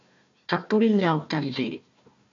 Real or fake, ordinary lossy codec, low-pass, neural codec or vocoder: fake; AAC, 64 kbps; 7.2 kHz; codec, 16 kHz, 1 kbps, FunCodec, trained on Chinese and English, 50 frames a second